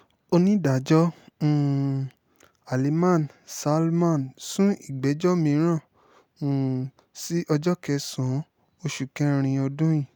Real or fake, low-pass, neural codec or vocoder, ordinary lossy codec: real; none; none; none